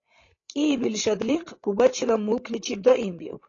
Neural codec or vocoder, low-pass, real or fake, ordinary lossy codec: codec, 16 kHz, 16 kbps, FreqCodec, larger model; 7.2 kHz; fake; AAC, 32 kbps